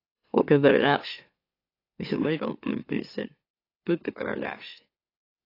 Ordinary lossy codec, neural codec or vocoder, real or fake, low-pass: AAC, 24 kbps; autoencoder, 44.1 kHz, a latent of 192 numbers a frame, MeloTTS; fake; 5.4 kHz